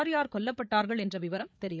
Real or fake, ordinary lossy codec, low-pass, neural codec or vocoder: fake; none; none; codec, 16 kHz, 8 kbps, FreqCodec, larger model